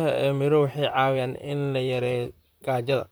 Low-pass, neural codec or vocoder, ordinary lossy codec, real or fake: none; vocoder, 44.1 kHz, 128 mel bands, Pupu-Vocoder; none; fake